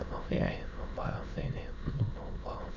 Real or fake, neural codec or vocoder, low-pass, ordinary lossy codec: fake; autoencoder, 22.05 kHz, a latent of 192 numbers a frame, VITS, trained on many speakers; 7.2 kHz; none